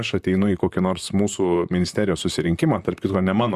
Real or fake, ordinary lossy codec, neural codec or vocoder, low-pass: fake; Opus, 64 kbps; vocoder, 44.1 kHz, 128 mel bands, Pupu-Vocoder; 14.4 kHz